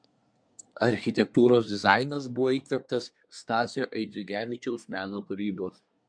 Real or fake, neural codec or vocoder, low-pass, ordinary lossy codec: fake; codec, 24 kHz, 1 kbps, SNAC; 9.9 kHz; MP3, 64 kbps